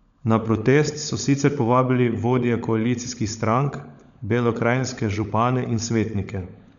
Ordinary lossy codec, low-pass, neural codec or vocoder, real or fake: none; 7.2 kHz; codec, 16 kHz, 16 kbps, FunCodec, trained on LibriTTS, 50 frames a second; fake